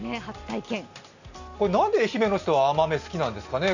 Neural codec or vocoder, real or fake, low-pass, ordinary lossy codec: none; real; 7.2 kHz; none